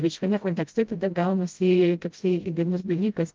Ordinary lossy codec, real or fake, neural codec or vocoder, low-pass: Opus, 32 kbps; fake; codec, 16 kHz, 0.5 kbps, FreqCodec, smaller model; 7.2 kHz